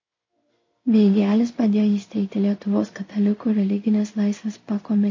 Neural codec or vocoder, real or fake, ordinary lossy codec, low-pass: codec, 16 kHz in and 24 kHz out, 1 kbps, XY-Tokenizer; fake; MP3, 32 kbps; 7.2 kHz